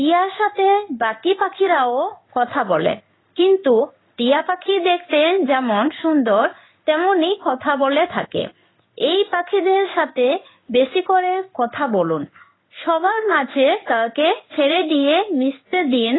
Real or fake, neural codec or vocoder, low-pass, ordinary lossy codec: fake; codec, 16 kHz in and 24 kHz out, 1 kbps, XY-Tokenizer; 7.2 kHz; AAC, 16 kbps